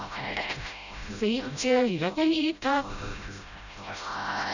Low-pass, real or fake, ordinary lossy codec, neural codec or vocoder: 7.2 kHz; fake; none; codec, 16 kHz, 0.5 kbps, FreqCodec, smaller model